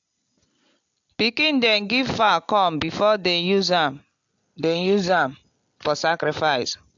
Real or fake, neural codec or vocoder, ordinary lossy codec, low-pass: real; none; AAC, 64 kbps; 7.2 kHz